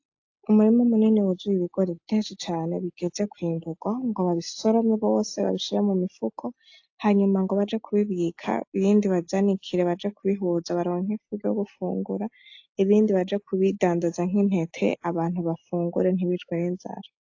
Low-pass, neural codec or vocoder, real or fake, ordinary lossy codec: 7.2 kHz; none; real; AAC, 48 kbps